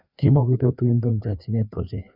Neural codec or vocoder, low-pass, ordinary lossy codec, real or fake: codec, 16 kHz, 2 kbps, FreqCodec, larger model; 5.4 kHz; none; fake